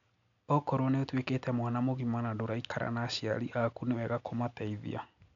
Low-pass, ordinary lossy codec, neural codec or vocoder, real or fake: 7.2 kHz; none; none; real